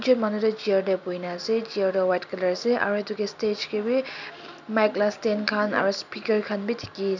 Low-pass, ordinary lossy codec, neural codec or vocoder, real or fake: 7.2 kHz; none; none; real